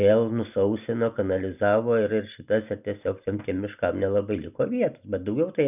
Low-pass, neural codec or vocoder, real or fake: 3.6 kHz; none; real